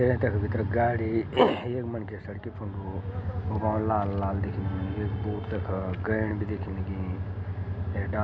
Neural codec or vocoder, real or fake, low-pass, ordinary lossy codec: none; real; none; none